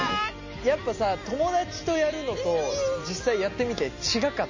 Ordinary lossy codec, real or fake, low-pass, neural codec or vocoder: MP3, 32 kbps; real; 7.2 kHz; none